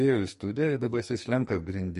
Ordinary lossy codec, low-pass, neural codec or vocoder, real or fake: MP3, 48 kbps; 14.4 kHz; codec, 44.1 kHz, 2.6 kbps, SNAC; fake